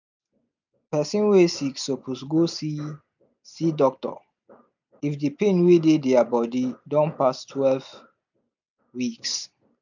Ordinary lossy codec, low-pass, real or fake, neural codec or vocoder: none; 7.2 kHz; real; none